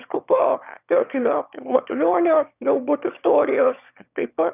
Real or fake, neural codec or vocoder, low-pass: fake; autoencoder, 22.05 kHz, a latent of 192 numbers a frame, VITS, trained on one speaker; 3.6 kHz